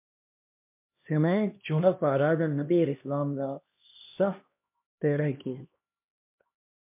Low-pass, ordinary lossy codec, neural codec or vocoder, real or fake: 3.6 kHz; MP3, 32 kbps; codec, 16 kHz, 1 kbps, X-Codec, HuBERT features, trained on LibriSpeech; fake